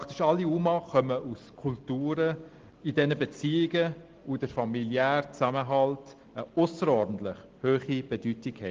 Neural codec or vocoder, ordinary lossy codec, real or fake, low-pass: none; Opus, 16 kbps; real; 7.2 kHz